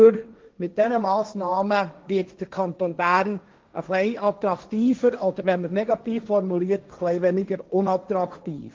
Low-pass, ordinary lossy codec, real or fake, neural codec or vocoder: 7.2 kHz; Opus, 32 kbps; fake; codec, 16 kHz, 1.1 kbps, Voila-Tokenizer